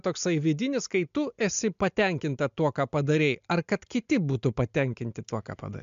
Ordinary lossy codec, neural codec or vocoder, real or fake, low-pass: MP3, 64 kbps; none; real; 7.2 kHz